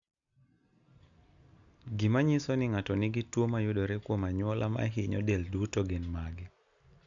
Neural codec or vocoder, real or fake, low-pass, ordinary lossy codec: none; real; 7.2 kHz; none